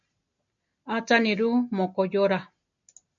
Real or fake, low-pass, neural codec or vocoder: real; 7.2 kHz; none